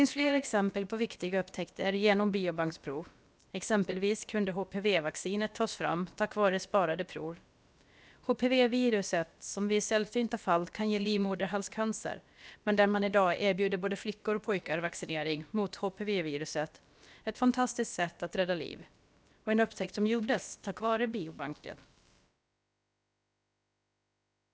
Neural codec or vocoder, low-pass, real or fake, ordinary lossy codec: codec, 16 kHz, about 1 kbps, DyCAST, with the encoder's durations; none; fake; none